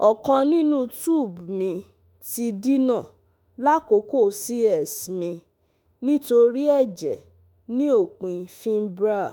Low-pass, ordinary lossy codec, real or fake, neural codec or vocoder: none; none; fake; autoencoder, 48 kHz, 32 numbers a frame, DAC-VAE, trained on Japanese speech